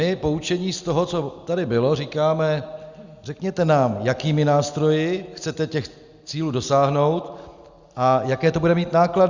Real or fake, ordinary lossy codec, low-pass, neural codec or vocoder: real; Opus, 64 kbps; 7.2 kHz; none